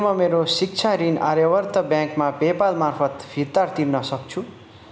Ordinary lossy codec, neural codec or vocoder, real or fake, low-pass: none; none; real; none